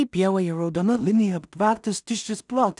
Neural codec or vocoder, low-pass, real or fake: codec, 16 kHz in and 24 kHz out, 0.4 kbps, LongCat-Audio-Codec, two codebook decoder; 10.8 kHz; fake